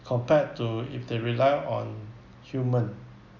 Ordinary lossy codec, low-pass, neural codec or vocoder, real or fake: none; 7.2 kHz; none; real